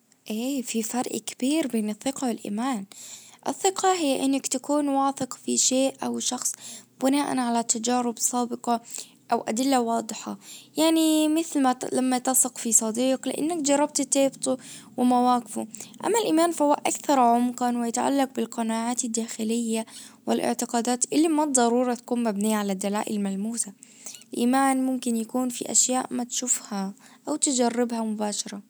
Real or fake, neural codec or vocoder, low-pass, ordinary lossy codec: real; none; none; none